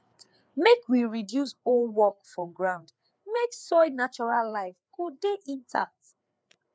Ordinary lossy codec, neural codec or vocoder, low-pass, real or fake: none; codec, 16 kHz, 4 kbps, FreqCodec, larger model; none; fake